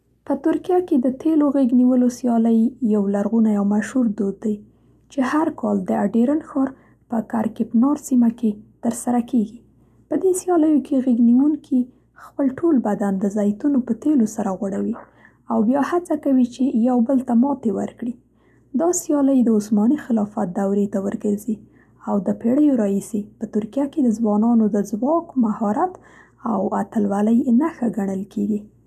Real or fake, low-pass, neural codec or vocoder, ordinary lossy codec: real; 14.4 kHz; none; none